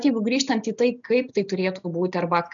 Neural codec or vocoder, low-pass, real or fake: none; 7.2 kHz; real